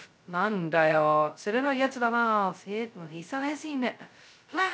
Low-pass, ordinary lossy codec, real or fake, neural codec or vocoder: none; none; fake; codec, 16 kHz, 0.2 kbps, FocalCodec